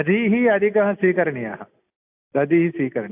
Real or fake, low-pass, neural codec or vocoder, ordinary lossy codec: real; 3.6 kHz; none; AAC, 32 kbps